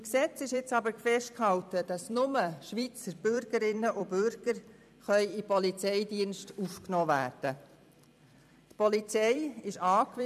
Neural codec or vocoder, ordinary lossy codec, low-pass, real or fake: vocoder, 44.1 kHz, 128 mel bands every 256 samples, BigVGAN v2; none; 14.4 kHz; fake